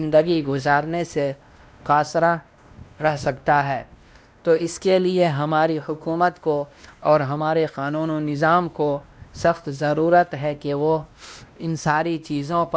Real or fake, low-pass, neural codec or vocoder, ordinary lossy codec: fake; none; codec, 16 kHz, 1 kbps, X-Codec, WavLM features, trained on Multilingual LibriSpeech; none